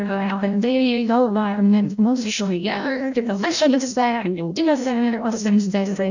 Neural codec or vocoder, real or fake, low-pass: codec, 16 kHz, 0.5 kbps, FreqCodec, larger model; fake; 7.2 kHz